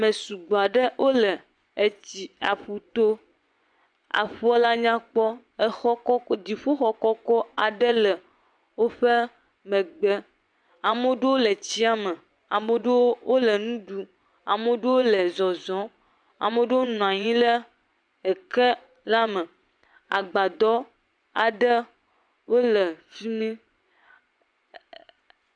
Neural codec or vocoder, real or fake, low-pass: vocoder, 24 kHz, 100 mel bands, Vocos; fake; 9.9 kHz